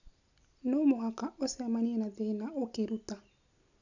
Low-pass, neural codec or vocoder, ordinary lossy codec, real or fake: 7.2 kHz; none; none; real